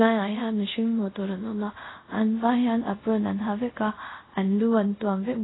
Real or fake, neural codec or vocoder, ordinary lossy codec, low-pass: fake; codec, 24 kHz, 0.5 kbps, DualCodec; AAC, 16 kbps; 7.2 kHz